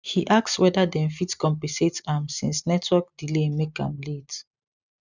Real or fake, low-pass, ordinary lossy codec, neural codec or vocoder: real; 7.2 kHz; none; none